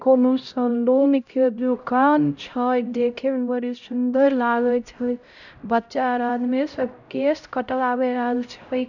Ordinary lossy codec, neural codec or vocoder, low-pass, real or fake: none; codec, 16 kHz, 0.5 kbps, X-Codec, HuBERT features, trained on LibriSpeech; 7.2 kHz; fake